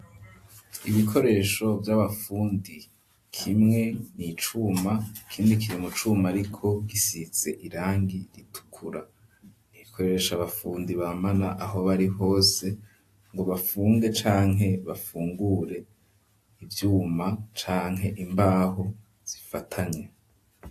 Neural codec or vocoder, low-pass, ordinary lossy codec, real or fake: none; 14.4 kHz; AAC, 64 kbps; real